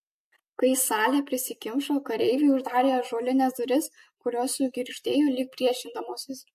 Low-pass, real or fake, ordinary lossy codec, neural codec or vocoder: 14.4 kHz; real; MP3, 64 kbps; none